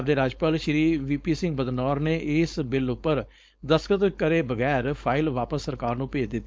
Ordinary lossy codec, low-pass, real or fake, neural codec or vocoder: none; none; fake; codec, 16 kHz, 4.8 kbps, FACodec